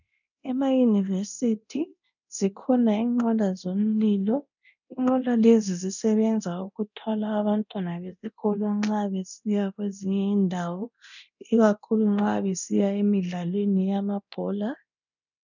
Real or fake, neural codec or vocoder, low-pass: fake; codec, 24 kHz, 0.9 kbps, DualCodec; 7.2 kHz